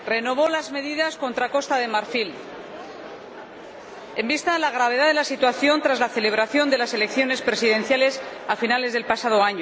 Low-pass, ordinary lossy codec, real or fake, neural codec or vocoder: none; none; real; none